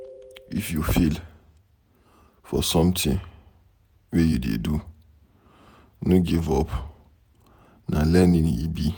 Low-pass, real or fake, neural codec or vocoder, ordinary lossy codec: none; fake; vocoder, 48 kHz, 128 mel bands, Vocos; none